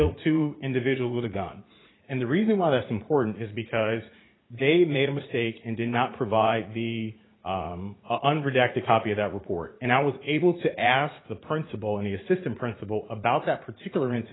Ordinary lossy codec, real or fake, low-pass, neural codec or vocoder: AAC, 16 kbps; fake; 7.2 kHz; vocoder, 44.1 kHz, 80 mel bands, Vocos